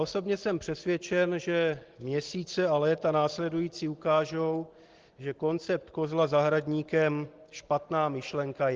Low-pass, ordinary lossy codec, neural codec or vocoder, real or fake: 7.2 kHz; Opus, 16 kbps; none; real